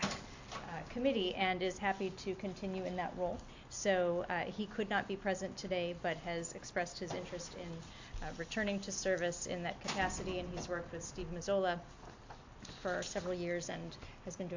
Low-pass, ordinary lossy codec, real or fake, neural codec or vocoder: 7.2 kHz; AAC, 48 kbps; real; none